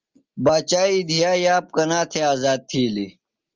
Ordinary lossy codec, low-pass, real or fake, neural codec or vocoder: Opus, 32 kbps; 7.2 kHz; real; none